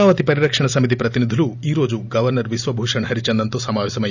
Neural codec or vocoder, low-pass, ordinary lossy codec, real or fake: none; 7.2 kHz; none; real